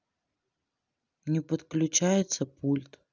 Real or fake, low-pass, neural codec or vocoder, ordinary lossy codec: real; 7.2 kHz; none; none